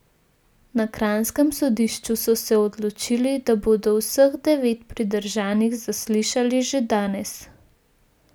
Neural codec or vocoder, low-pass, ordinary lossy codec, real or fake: none; none; none; real